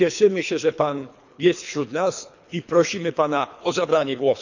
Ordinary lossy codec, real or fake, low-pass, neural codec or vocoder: none; fake; 7.2 kHz; codec, 24 kHz, 3 kbps, HILCodec